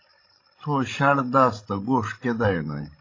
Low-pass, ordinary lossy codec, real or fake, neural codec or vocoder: 7.2 kHz; AAC, 32 kbps; fake; codec, 16 kHz, 16 kbps, FreqCodec, larger model